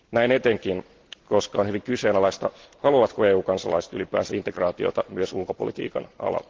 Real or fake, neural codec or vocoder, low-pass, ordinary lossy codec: real; none; 7.2 kHz; Opus, 16 kbps